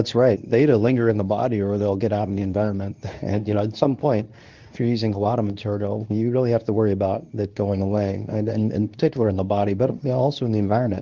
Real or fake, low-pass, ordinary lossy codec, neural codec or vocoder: fake; 7.2 kHz; Opus, 24 kbps; codec, 24 kHz, 0.9 kbps, WavTokenizer, medium speech release version 2